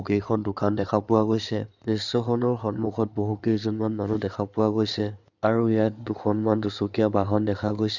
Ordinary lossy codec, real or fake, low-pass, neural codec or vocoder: none; fake; 7.2 kHz; codec, 16 kHz in and 24 kHz out, 2.2 kbps, FireRedTTS-2 codec